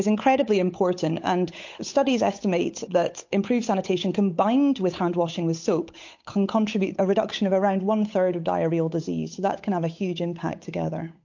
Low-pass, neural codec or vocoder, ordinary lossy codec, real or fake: 7.2 kHz; codec, 16 kHz, 8 kbps, FunCodec, trained on Chinese and English, 25 frames a second; MP3, 48 kbps; fake